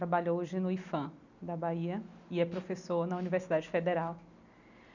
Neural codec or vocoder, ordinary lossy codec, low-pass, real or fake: none; none; 7.2 kHz; real